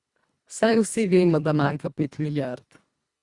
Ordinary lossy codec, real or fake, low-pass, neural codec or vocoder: Opus, 64 kbps; fake; 10.8 kHz; codec, 24 kHz, 1.5 kbps, HILCodec